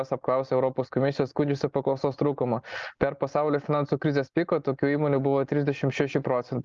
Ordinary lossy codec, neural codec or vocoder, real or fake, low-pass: Opus, 32 kbps; none; real; 7.2 kHz